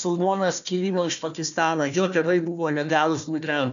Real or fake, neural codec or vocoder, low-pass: fake; codec, 16 kHz, 1 kbps, FunCodec, trained on Chinese and English, 50 frames a second; 7.2 kHz